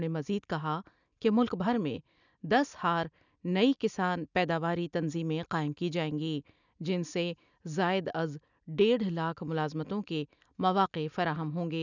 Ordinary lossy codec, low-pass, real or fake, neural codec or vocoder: none; 7.2 kHz; real; none